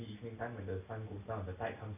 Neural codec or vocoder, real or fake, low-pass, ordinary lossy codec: none; real; 3.6 kHz; AAC, 24 kbps